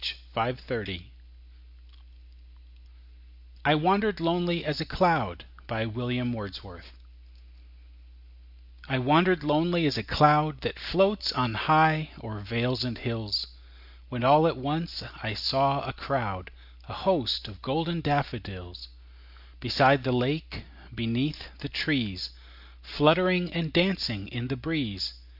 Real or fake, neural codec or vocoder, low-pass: real; none; 5.4 kHz